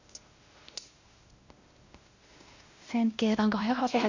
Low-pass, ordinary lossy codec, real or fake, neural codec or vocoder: 7.2 kHz; Opus, 64 kbps; fake; codec, 16 kHz, 1 kbps, X-Codec, WavLM features, trained on Multilingual LibriSpeech